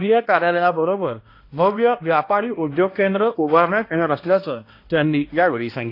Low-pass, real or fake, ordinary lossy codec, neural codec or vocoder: 5.4 kHz; fake; AAC, 32 kbps; codec, 16 kHz, 1 kbps, X-Codec, HuBERT features, trained on balanced general audio